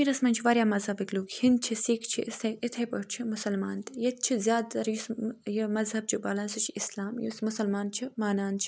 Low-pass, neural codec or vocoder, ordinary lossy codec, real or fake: none; none; none; real